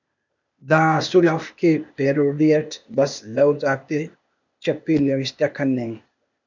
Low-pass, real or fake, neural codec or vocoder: 7.2 kHz; fake; codec, 16 kHz, 0.8 kbps, ZipCodec